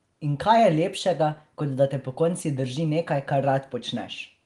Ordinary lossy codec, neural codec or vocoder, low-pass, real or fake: Opus, 24 kbps; none; 10.8 kHz; real